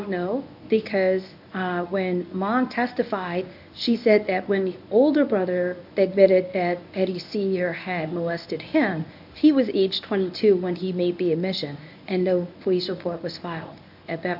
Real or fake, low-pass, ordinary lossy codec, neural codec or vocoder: fake; 5.4 kHz; AAC, 48 kbps; codec, 24 kHz, 0.9 kbps, WavTokenizer, medium speech release version 1